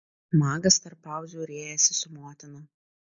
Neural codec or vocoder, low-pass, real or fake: none; 7.2 kHz; real